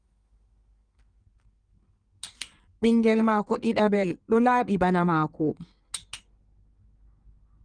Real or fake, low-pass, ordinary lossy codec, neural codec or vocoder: fake; 9.9 kHz; Opus, 32 kbps; codec, 16 kHz in and 24 kHz out, 1.1 kbps, FireRedTTS-2 codec